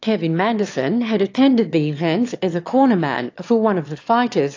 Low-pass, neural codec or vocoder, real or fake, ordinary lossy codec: 7.2 kHz; autoencoder, 22.05 kHz, a latent of 192 numbers a frame, VITS, trained on one speaker; fake; AAC, 48 kbps